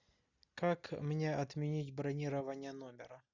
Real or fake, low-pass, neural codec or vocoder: real; 7.2 kHz; none